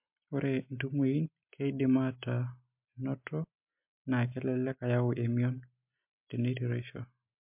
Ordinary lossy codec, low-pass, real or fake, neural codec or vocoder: MP3, 32 kbps; 3.6 kHz; real; none